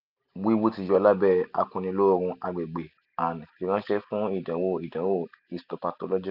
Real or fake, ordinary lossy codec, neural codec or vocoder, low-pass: real; none; none; 5.4 kHz